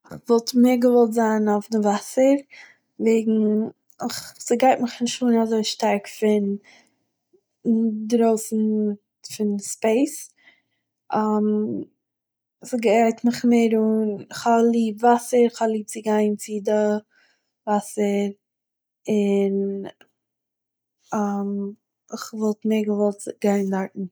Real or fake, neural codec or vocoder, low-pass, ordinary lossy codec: real; none; none; none